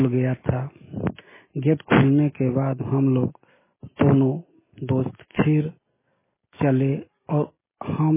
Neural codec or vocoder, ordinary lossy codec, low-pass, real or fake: none; MP3, 16 kbps; 3.6 kHz; real